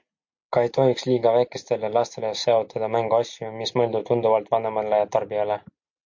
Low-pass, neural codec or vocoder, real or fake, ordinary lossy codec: 7.2 kHz; none; real; MP3, 48 kbps